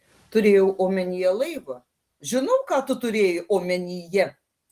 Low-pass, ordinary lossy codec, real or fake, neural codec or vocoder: 14.4 kHz; Opus, 24 kbps; real; none